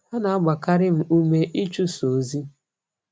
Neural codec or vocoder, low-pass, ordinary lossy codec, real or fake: none; none; none; real